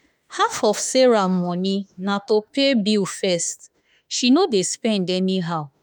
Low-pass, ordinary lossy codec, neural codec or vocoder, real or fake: none; none; autoencoder, 48 kHz, 32 numbers a frame, DAC-VAE, trained on Japanese speech; fake